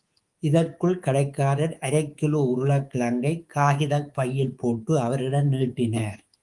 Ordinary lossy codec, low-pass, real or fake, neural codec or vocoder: Opus, 24 kbps; 10.8 kHz; fake; codec, 24 kHz, 3.1 kbps, DualCodec